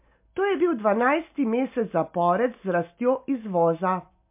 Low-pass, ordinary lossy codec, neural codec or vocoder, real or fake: 3.6 kHz; MP3, 32 kbps; none; real